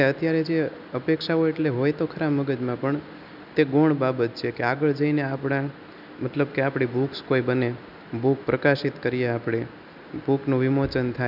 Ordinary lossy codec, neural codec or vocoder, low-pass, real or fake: none; none; 5.4 kHz; real